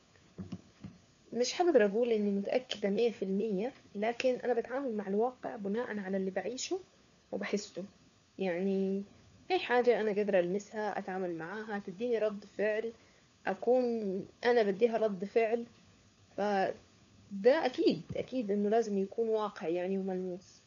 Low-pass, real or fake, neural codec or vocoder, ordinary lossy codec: 7.2 kHz; fake; codec, 16 kHz, 4 kbps, FunCodec, trained on LibriTTS, 50 frames a second; none